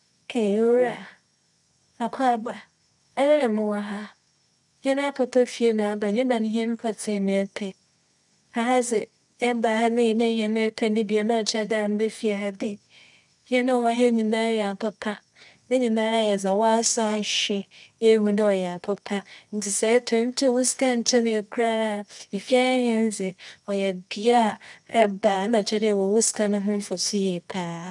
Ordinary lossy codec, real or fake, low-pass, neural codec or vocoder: none; fake; 10.8 kHz; codec, 24 kHz, 0.9 kbps, WavTokenizer, medium music audio release